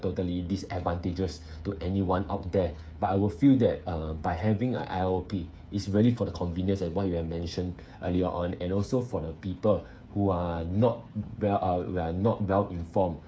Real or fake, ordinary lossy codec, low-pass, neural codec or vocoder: fake; none; none; codec, 16 kHz, 8 kbps, FreqCodec, smaller model